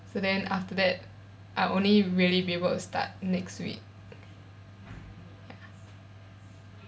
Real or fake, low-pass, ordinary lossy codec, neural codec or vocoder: real; none; none; none